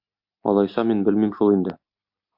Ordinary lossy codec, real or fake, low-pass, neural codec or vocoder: MP3, 48 kbps; real; 5.4 kHz; none